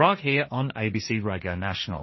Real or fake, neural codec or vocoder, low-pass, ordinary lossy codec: fake; codec, 16 kHz, 1.1 kbps, Voila-Tokenizer; 7.2 kHz; MP3, 24 kbps